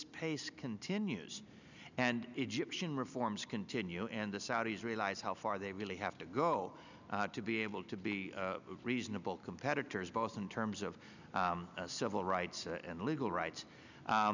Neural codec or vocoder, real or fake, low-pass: none; real; 7.2 kHz